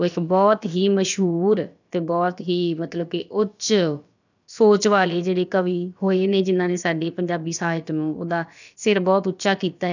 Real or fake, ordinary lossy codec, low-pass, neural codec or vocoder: fake; none; 7.2 kHz; codec, 16 kHz, about 1 kbps, DyCAST, with the encoder's durations